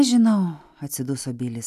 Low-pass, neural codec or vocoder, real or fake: 14.4 kHz; none; real